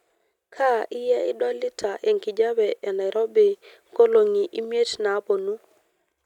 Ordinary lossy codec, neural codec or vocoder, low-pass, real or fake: none; none; 19.8 kHz; real